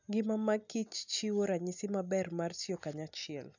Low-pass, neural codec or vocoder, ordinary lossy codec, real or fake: 7.2 kHz; none; none; real